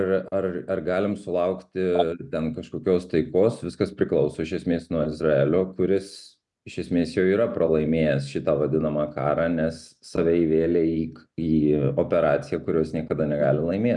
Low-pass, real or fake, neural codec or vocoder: 10.8 kHz; real; none